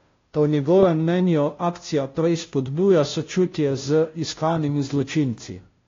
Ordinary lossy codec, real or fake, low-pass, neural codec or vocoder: AAC, 32 kbps; fake; 7.2 kHz; codec, 16 kHz, 0.5 kbps, FunCodec, trained on Chinese and English, 25 frames a second